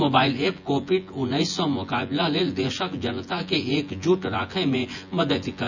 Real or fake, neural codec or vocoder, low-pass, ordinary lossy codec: fake; vocoder, 24 kHz, 100 mel bands, Vocos; 7.2 kHz; none